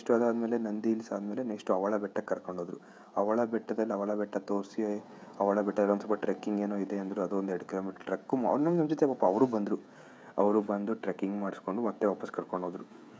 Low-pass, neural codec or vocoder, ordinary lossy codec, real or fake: none; codec, 16 kHz, 16 kbps, FreqCodec, smaller model; none; fake